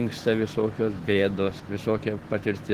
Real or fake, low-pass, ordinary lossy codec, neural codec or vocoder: fake; 14.4 kHz; Opus, 16 kbps; autoencoder, 48 kHz, 128 numbers a frame, DAC-VAE, trained on Japanese speech